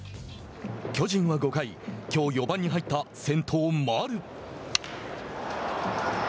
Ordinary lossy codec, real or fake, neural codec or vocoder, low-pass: none; real; none; none